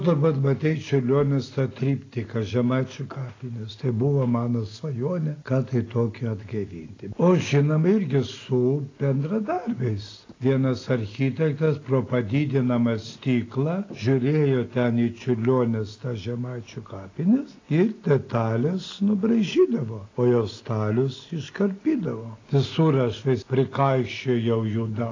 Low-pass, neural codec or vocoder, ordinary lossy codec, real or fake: 7.2 kHz; none; AAC, 32 kbps; real